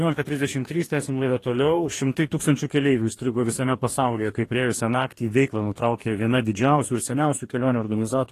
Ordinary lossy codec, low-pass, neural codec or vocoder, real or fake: AAC, 48 kbps; 14.4 kHz; codec, 44.1 kHz, 2.6 kbps, DAC; fake